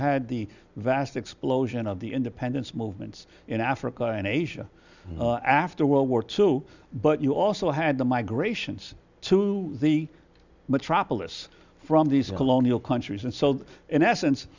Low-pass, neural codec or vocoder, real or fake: 7.2 kHz; none; real